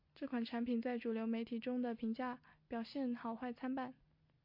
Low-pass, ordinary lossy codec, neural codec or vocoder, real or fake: 5.4 kHz; MP3, 32 kbps; none; real